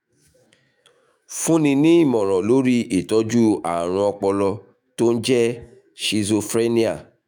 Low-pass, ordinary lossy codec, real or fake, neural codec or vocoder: none; none; fake; autoencoder, 48 kHz, 128 numbers a frame, DAC-VAE, trained on Japanese speech